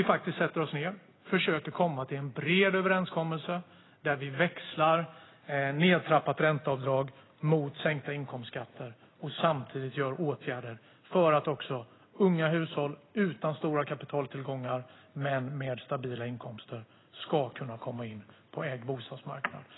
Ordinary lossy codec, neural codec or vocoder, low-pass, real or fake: AAC, 16 kbps; none; 7.2 kHz; real